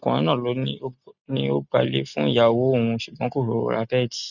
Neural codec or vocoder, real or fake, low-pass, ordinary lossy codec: none; real; 7.2 kHz; none